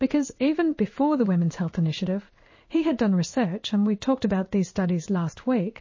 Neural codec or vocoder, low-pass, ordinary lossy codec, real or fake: codec, 16 kHz in and 24 kHz out, 1 kbps, XY-Tokenizer; 7.2 kHz; MP3, 32 kbps; fake